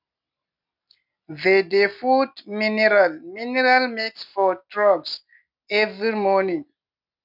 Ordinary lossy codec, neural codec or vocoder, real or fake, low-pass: none; none; real; 5.4 kHz